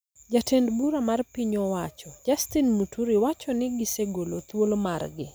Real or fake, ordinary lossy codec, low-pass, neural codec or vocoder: real; none; none; none